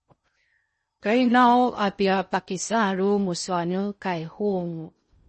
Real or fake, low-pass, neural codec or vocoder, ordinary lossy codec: fake; 10.8 kHz; codec, 16 kHz in and 24 kHz out, 0.6 kbps, FocalCodec, streaming, 4096 codes; MP3, 32 kbps